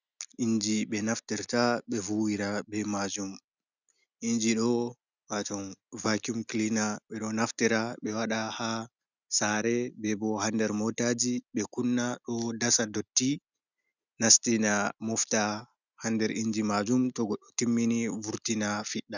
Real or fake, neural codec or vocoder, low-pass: real; none; 7.2 kHz